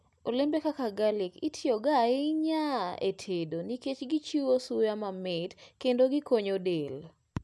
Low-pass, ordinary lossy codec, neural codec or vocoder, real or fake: none; none; none; real